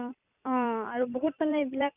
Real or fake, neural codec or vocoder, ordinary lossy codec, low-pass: fake; vocoder, 44.1 kHz, 128 mel bands every 256 samples, BigVGAN v2; none; 3.6 kHz